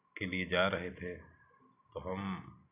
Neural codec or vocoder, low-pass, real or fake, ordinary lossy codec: none; 3.6 kHz; real; none